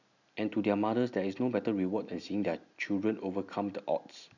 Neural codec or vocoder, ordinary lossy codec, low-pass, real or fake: none; none; 7.2 kHz; real